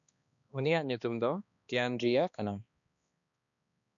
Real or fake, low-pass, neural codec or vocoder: fake; 7.2 kHz; codec, 16 kHz, 2 kbps, X-Codec, HuBERT features, trained on balanced general audio